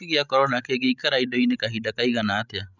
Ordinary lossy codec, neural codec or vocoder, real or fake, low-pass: none; codec, 16 kHz, 16 kbps, FreqCodec, larger model; fake; 7.2 kHz